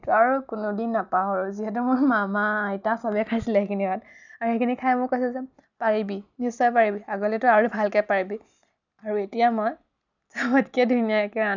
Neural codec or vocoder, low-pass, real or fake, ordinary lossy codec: none; 7.2 kHz; real; none